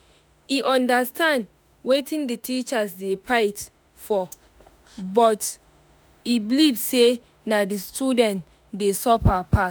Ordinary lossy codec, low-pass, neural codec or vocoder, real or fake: none; none; autoencoder, 48 kHz, 32 numbers a frame, DAC-VAE, trained on Japanese speech; fake